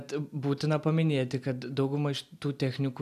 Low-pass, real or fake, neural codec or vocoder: 14.4 kHz; real; none